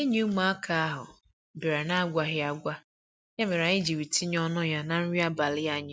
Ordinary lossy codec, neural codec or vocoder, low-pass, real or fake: none; none; none; real